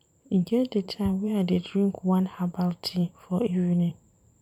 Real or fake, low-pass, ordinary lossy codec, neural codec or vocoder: fake; 19.8 kHz; none; vocoder, 44.1 kHz, 128 mel bands every 512 samples, BigVGAN v2